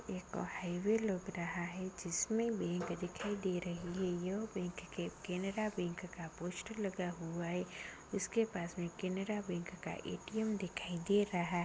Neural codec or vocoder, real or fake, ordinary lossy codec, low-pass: none; real; none; none